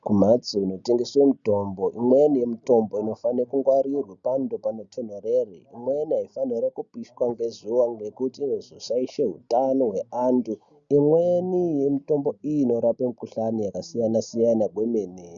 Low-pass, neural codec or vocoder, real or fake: 7.2 kHz; none; real